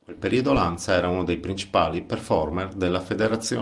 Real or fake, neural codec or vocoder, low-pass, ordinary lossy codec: real; none; 10.8 kHz; Opus, 32 kbps